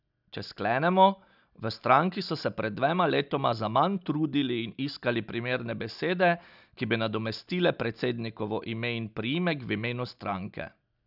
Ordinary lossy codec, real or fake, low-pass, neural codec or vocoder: none; real; 5.4 kHz; none